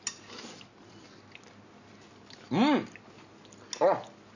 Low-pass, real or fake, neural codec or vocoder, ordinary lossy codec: 7.2 kHz; real; none; none